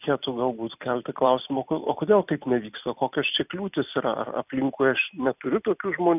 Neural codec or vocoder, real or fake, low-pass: vocoder, 44.1 kHz, 128 mel bands every 256 samples, BigVGAN v2; fake; 3.6 kHz